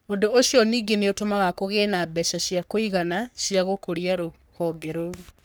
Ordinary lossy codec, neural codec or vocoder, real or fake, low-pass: none; codec, 44.1 kHz, 3.4 kbps, Pupu-Codec; fake; none